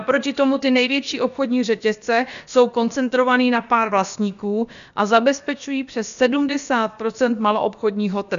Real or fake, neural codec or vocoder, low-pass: fake; codec, 16 kHz, about 1 kbps, DyCAST, with the encoder's durations; 7.2 kHz